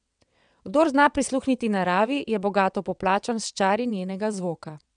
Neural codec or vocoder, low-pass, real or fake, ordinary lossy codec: vocoder, 22.05 kHz, 80 mel bands, WaveNeXt; 9.9 kHz; fake; none